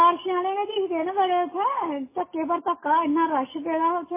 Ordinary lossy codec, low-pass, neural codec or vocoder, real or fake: MP3, 16 kbps; 3.6 kHz; none; real